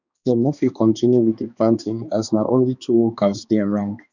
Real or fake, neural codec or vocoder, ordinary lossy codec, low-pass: fake; codec, 16 kHz, 2 kbps, X-Codec, HuBERT features, trained on balanced general audio; none; 7.2 kHz